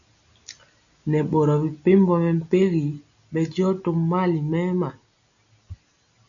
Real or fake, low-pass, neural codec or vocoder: real; 7.2 kHz; none